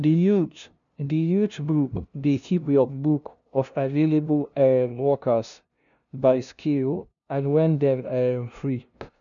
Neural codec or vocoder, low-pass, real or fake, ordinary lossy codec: codec, 16 kHz, 0.5 kbps, FunCodec, trained on LibriTTS, 25 frames a second; 7.2 kHz; fake; none